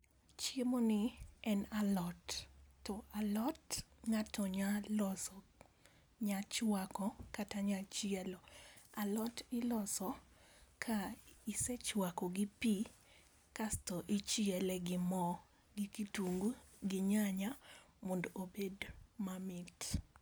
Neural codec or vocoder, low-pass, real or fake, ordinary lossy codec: none; none; real; none